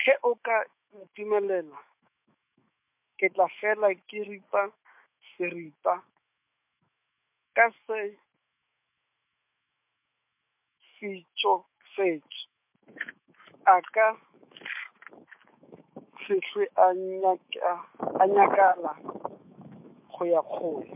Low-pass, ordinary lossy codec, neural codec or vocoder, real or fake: 3.6 kHz; MP3, 32 kbps; autoencoder, 48 kHz, 128 numbers a frame, DAC-VAE, trained on Japanese speech; fake